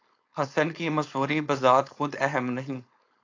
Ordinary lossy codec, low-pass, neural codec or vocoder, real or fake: AAC, 48 kbps; 7.2 kHz; codec, 16 kHz, 4.8 kbps, FACodec; fake